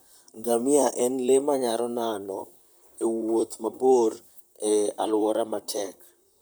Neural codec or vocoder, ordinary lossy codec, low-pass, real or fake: vocoder, 44.1 kHz, 128 mel bands, Pupu-Vocoder; none; none; fake